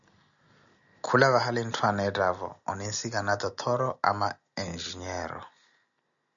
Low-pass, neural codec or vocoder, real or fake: 7.2 kHz; none; real